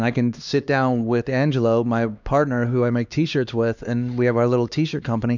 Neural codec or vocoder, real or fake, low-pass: codec, 16 kHz, 2 kbps, X-Codec, HuBERT features, trained on LibriSpeech; fake; 7.2 kHz